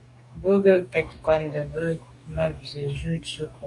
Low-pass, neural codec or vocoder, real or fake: 10.8 kHz; codec, 44.1 kHz, 2.6 kbps, DAC; fake